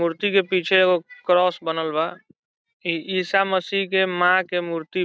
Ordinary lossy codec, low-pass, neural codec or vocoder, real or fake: none; none; none; real